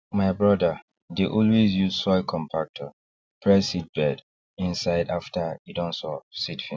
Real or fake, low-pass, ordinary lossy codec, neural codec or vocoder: real; none; none; none